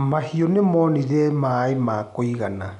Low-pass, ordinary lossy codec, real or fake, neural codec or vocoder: 10.8 kHz; none; real; none